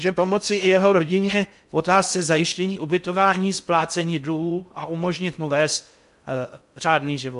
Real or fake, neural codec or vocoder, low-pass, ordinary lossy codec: fake; codec, 16 kHz in and 24 kHz out, 0.6 kbps, FocalCodec, streaming, 2048 codes; 10.8 kHz; MP3, 64 kbps